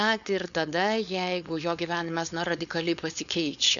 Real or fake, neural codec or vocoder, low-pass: fake; codec, 16 kHz, 4.8 kbps, FACodec; 7.2 kHz